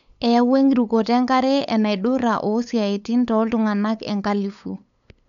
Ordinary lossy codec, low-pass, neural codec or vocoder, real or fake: none; 7.2 kHz; codec, 16 kHz, 8 kbps, FunCodec, trained on LibriTTS, 25 frames a second; fake